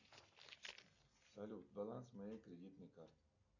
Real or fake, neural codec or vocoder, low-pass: real; none; 7.2 kHz